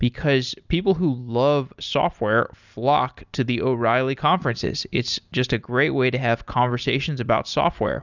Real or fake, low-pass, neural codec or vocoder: real; 7.2 kHz; none